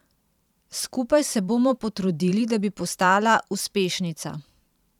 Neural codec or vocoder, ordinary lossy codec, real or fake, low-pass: vocoder, 44.1 kHz, 128 mel bands every 512 samples, BigVGAN v2; none; fake; 19.8 kHz